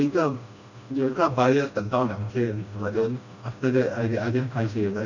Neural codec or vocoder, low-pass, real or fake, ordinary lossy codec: codec, 16 kHz, 1 kbps, FreqCodec, smaller model; 7.2 kHz; fake; none